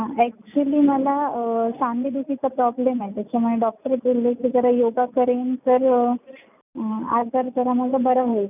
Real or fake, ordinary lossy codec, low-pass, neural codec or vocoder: real; none; 3.6 kHz; none